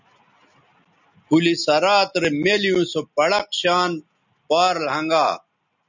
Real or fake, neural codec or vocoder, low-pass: real; none; 7.2 kHz